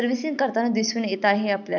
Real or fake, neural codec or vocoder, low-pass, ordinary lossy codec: real; none; 7.2 kHz; none